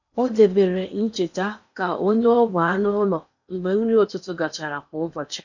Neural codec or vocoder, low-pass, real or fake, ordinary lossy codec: codec, 16 kHz in and 24 kHz out, 0.8 kbps, FocalCodec, streaming, 65536 codes; 7.2 kHz; fake; none